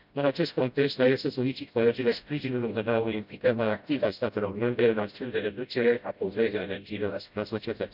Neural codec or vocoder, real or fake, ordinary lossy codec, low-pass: codec, 16 kHz, 0.5 kbps, FreqCodec, smaller model; fake; none; 5.4 kHz